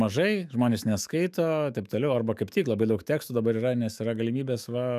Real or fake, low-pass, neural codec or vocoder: fake; 14.4 kHz; autoencoder, 48 kHz, 128 numbers a frame, DAC-VAE, trained on Japanese speech